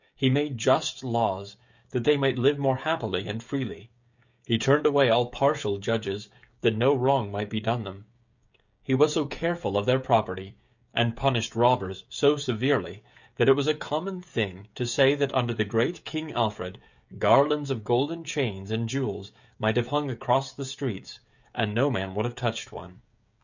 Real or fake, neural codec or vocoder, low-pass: fake; codec, 16 kHz, 16 kbps, FreqCodec, smaller model; 7.2 kHz